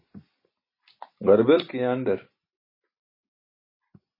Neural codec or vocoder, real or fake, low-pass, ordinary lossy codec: none; real; 5.4 kHz; MP3, 24 kbps